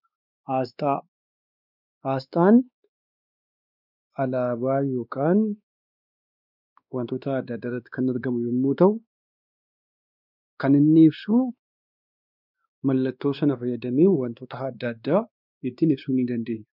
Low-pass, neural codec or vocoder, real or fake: 5.4 kHz; codec, 16 kHz, 2 kbps, X-Codec, WavLM features, trained on Multilingual LibriSpeech; fake